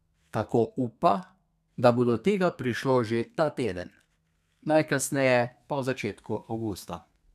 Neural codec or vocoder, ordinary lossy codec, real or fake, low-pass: codec, 32 kHz, 1.9 kbps, SNAC; none; fake; 14.4 kHz